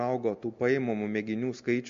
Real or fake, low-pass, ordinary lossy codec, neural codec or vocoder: real; 7.2 kHz; MP3, 48 kbps; none